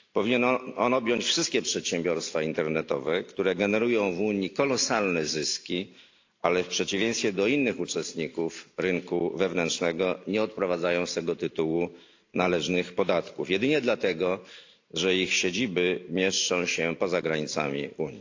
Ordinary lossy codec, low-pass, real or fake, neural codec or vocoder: AAC, 48 kbps; 7.2 kHz; real; none